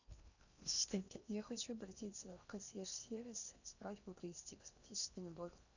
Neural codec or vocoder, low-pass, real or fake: codec, 16 kHz in and 24 kHz out, 0.8 kbps, FocalCodec, streaming, 65536 codes; 7.2 kHz; fake